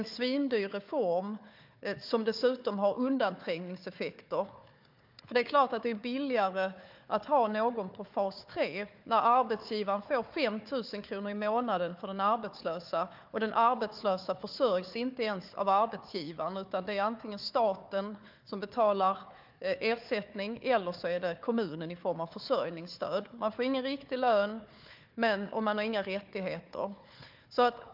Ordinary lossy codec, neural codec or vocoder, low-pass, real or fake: MP3, 48 kbps; codec, 16 kHz, 4 kbps, FunCodec, trained on Chinese and English, 50 frames a second; 5.4 kHz; fake